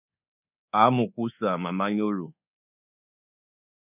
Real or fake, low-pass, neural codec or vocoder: fake; 3.6 kHz; codec, 24 kHz, 1.2 kbps, DualCodec